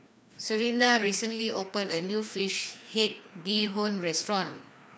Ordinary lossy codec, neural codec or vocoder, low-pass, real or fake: none; codec, 16 kHz, 2 kbps, FreqCodec, larger model; none; fake